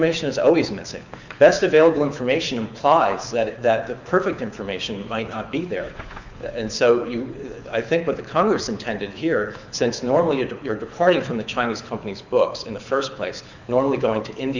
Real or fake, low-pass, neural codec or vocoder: fake; 7.2 kHz; codec, 24 kHz, 6 kbps, HILCodec